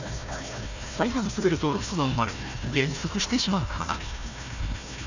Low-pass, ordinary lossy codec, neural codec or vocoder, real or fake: 7.2 kHz; none; codec, 16 kHz, 1 kbps, FunCodec, trained on Chinese and English, 50 frames a second; fake